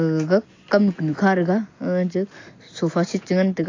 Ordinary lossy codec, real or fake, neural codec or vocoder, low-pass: AAC, 32 kbps; real; none; 7.2 kHz